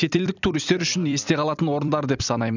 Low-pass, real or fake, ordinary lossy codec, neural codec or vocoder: 7.2 kHz; fake; none; vocoder, 44.1 kHz, 128 mel bands every 256 samples, BigVGAN v2